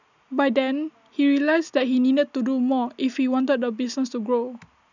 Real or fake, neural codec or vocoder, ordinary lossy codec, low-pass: real; none; none; 7.2 kHz